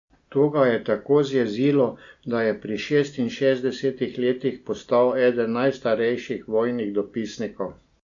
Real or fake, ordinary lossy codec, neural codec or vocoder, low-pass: real; MP3, 64 kbps; none; 7.2 kHz